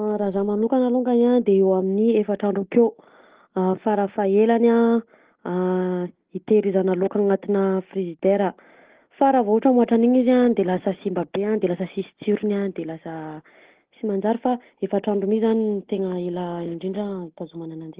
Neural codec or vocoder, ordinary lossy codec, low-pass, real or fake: none; Opus, 16 kbps; 3.6 kHz; real